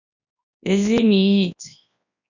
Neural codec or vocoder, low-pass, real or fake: codec, 24 kHz, 0.9 kbps, WavTokenizer, large speech release; 7.2 kHz; fake